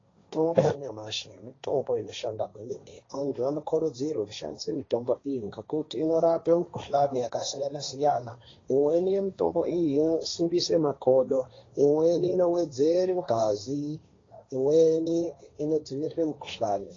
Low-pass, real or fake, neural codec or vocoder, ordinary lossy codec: 7.2 kHz; fake; codec, 16 kHz, 1.1 kbps, Voila-Tokenizer; AAC, 32 kbps